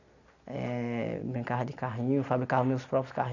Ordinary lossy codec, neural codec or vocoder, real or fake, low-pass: none; none; real; 7.2 kHz